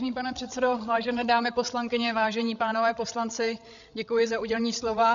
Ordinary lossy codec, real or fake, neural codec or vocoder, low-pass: MP3, 96 kbps; fake; codec, 16 kHz, 8 kbps, FreqCodec, larger model; 7.2 kHz